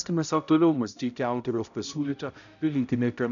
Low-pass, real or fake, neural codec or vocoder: 7.2 kHz; fake; codec, 16 kHz, 0.5 kbps, X-Codec, HuBERT features, trained on balanced general audio